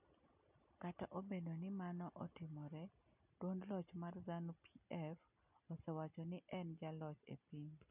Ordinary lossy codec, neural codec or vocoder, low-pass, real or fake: none; none; 3.6 kHz; real